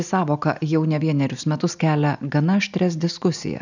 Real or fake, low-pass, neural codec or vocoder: real; 7.2 kHz; none